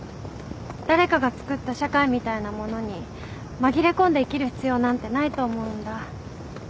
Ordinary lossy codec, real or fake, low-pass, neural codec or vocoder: none; real; none; none